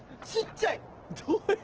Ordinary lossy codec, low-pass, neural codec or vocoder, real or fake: Opus, 16 kbps; 7.2 kHz; none; real